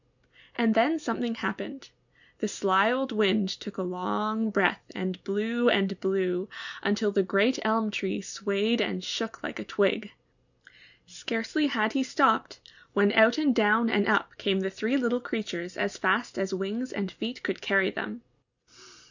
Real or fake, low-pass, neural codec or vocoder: real; 7.2 kHz; none